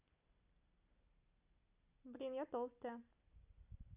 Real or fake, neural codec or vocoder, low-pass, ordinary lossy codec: real; none; 3.6 kHz; none